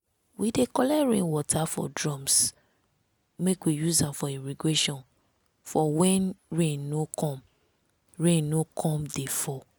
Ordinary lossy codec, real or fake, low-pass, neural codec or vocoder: none; real; none; none